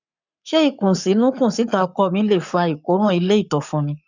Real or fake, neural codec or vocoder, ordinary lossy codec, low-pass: fake; codec, 44.1 kHz, 7.8 kbps, Pupu-Codec; none; 7.2 kHz